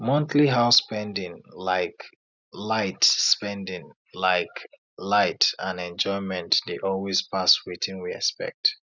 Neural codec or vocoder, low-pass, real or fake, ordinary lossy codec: none; 7.2 kHz; real; none